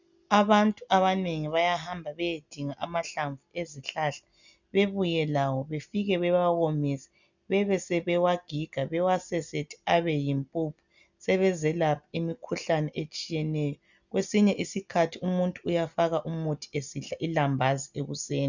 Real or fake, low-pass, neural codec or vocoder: real; 7.2 kHz; none